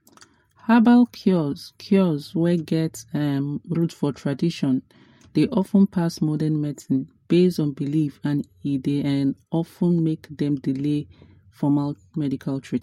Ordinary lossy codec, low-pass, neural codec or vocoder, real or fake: MP3, 64 kbps; 19.8 kHz; none; real